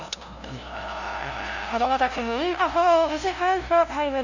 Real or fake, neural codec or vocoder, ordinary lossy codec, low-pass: fake; codec, 16 kHz, 0.5 kbps, FunCodec, trained on LibriTTS, 25 frames a second; none; 7.2 kHz